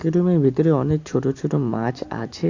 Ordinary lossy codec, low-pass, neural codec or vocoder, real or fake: AAC, 48 kbps; 7.2 kHz; none; real